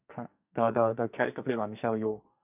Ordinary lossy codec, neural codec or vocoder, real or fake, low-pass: none; codec, 44.1 kHz, 2.6 kbps, SNAC; fake; 3.6 kHz